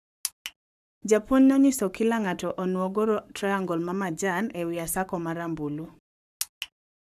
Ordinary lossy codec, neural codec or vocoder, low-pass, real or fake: none; codec, 44.1 kHz, 7.8 kbps, Pupu-Codec; 14.4 kHz; fake